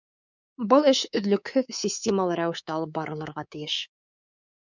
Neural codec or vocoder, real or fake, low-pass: codec, 24 kHz, 3.1 kbps, DualCodec; fake; 7.2 kHz